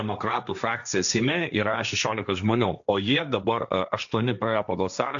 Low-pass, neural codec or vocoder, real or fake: 7.2 kHz; codec, 16 kHz, 1.1 kbps, Voila-Tokenizer; fake